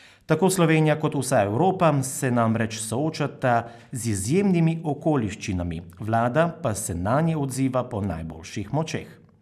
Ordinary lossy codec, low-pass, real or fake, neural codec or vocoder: none; 14.4 kHz; real; none